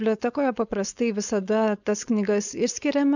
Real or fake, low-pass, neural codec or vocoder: fake; 7.2 kHz; codec, 16 kHz, 4.8 kbps, FACodec